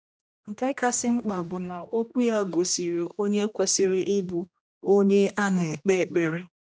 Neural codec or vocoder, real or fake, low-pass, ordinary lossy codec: codec, 16 kHz, 1 kbps, X-Codec, HuBERT features, trained on general audio; fake; none; none